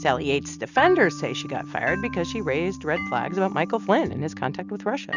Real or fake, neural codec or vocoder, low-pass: real; none; 7.2 kHz